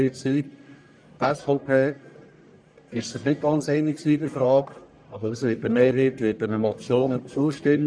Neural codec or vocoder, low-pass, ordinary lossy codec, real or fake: codec, 44.1 kHz, 1.7 kbps, Pupu-Codec; 9.9 kHz; none; fake